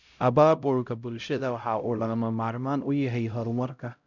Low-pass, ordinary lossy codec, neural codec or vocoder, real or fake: 7.2 kHz; none; codec, 16 kHz, 0.5 kbps, X-Codec, HuBERT features, trained on LibriSpeech; fake